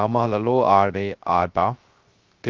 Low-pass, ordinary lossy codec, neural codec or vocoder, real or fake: 7.2 kHz; Opus, 32 kbps; codec, 16 kHz, 0.3 kbps, FocalCodec; fake